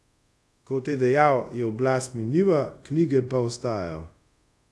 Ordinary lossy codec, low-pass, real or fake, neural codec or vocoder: none; none; fake; codec, 24 kHz, 0.5 kbps, DualCodec